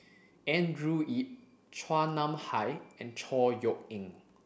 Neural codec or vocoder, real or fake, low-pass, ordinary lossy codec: none; real; none; none